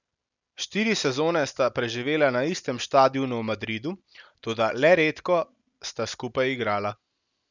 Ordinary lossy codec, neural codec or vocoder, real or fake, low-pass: none; none; real; 7.2 kHz